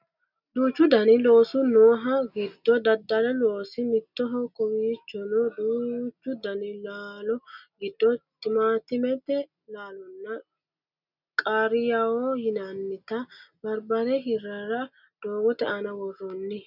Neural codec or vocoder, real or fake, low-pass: none; real; 5.4 kHz